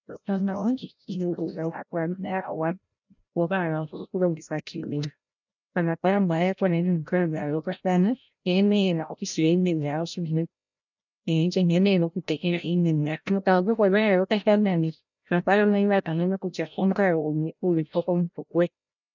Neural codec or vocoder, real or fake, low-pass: codec, 16 kHz, 0.5 kbps, FreqCodec, larger model; fake; 7.2 kHz